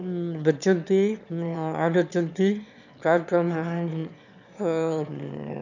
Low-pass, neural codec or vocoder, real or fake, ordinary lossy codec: 7.2 kHz; autoencoder, 22.05 kHz, a latent of 192 numbers a frame, VITS, trained on one speaker; fake; none